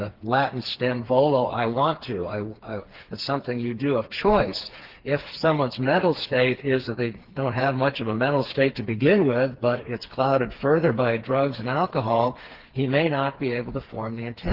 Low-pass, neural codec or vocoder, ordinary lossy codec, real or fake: 5.4 kHz; codec, 16 kHz, 4 kbps, FreqCodec, smaller model; Opus, 16 kbps; fake